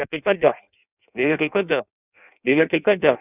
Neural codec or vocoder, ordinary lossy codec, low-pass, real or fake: codec, 16 kHz in and 24 kHz out, 0.6 kbps, FireRedTTS-2 codec; none; 3.6 kHz; fake